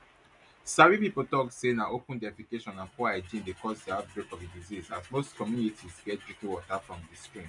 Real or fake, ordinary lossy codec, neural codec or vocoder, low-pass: real; none; none; 10.8 kHz